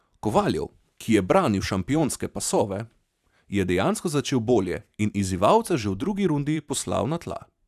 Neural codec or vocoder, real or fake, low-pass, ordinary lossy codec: vocoder, 44.1 kHz, 128 mel bands every 512 samples, BigVGAN v2; fake; 14.4 kHz; none